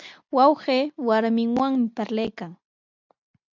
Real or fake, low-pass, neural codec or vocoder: real; 7.2 kHz; none